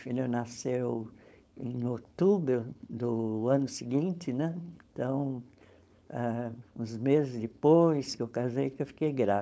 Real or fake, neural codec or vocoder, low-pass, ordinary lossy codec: fake; codec, 16 kHz, 4.8 kbps, FACodec; none; none